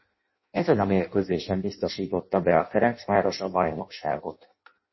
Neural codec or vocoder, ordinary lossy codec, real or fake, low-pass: codec, 16 kHz in and 24 kHz out, 0.6 kbps, FireRedTTS-2 codec; MP3, 24 kbps; fake; 7.2 kHz